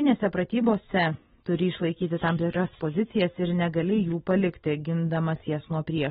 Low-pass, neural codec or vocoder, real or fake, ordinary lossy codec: 7.2 kHz; none; real; AAC, 16 kbps